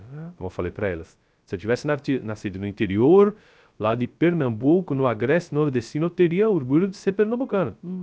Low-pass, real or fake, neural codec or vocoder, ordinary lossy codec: none; fake; codec, 16 kHz, 0.3 kbps, FocalCodec; none